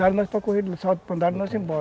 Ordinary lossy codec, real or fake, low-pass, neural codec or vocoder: none; real; none; none